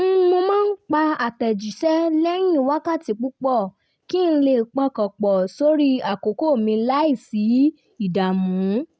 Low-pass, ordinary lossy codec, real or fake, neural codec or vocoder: none; none; real; none